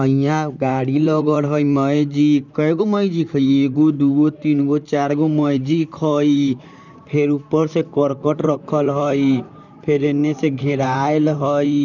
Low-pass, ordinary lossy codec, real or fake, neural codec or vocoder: 7.2 kHz; none; fake; vocoder, 44.1 kHz, 128 mel bands, Pupu-Vocoder